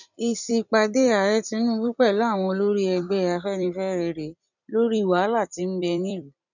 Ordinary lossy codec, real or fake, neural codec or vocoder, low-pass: none; fake; vocoder, 44.1 kHz, 128 mel bands every 256 samples, BigVGAN v2; 7.2 kHz